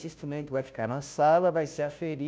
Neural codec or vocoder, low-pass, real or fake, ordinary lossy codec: codec, 16 kHz, 0.5 kbps, FunCodec, trained on Chinese and English, 25 frames a second; none; fake; none